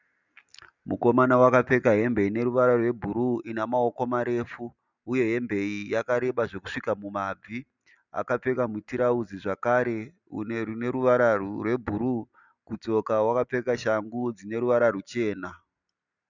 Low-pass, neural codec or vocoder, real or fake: 7.2 kHz; none; real